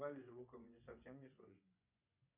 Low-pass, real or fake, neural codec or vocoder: 3.6 kHz; fake; vocoder, 24 kHz, 100 mel bands, Vocos